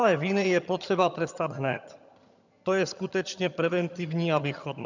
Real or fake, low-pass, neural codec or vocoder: fake; 7.2 kHz; vocoder, 22.05 kHz, 80 mel bands, HiFi-GAN